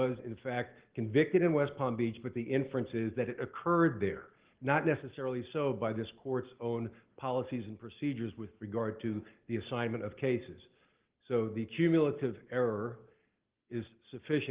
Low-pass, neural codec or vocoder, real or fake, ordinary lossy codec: 3.6 kHz; none; real; Opus, 16 kbps